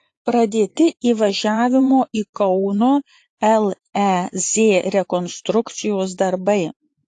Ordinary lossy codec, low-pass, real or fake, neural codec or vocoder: AAC, 48 kbps; 10.8 kHz; fake; vocoder, 24 kHz, 100 mel bands, Vocos